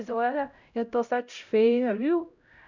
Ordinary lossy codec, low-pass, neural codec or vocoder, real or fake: none; 7.2 kHz; codec, 16 kHz, 0.5 kbps, X-Codec, HuBERT features, trained on LibriSpeech; fake